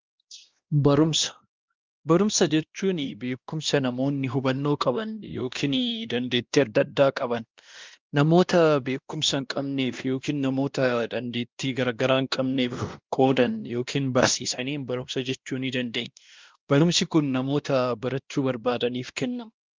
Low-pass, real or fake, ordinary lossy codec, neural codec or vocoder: 7.2 kHz; fake; Opus, 24 kbps; codec, 16 kHz, 1 kbps, X-Codec, WavLM features, trained on Multilingual LibriSpeech